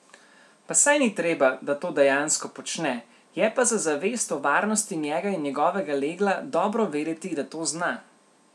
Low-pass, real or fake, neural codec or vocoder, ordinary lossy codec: none; real; none; none